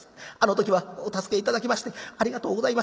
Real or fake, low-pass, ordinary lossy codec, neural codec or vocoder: real; none; none; none